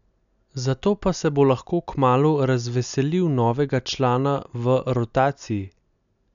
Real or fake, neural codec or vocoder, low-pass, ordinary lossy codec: real; none; 7.2 kHz; none